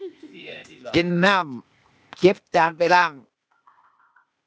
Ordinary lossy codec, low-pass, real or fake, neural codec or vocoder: none; none; fake; codec, 16 kHz, 0.8 kbps, ZipCodec